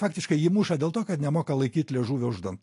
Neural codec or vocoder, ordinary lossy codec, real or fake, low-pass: none; AAC, 48 kbps; real; 10.8 kHz